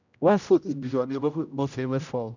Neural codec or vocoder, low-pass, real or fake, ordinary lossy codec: codec, 16 kHz, 0.5 kbps, X-Codec, HuBERT features, trained on general audio; 7.2 kHz; fake; none